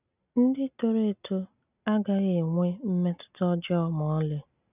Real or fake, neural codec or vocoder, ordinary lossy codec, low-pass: real; none; none; 3.6 kHz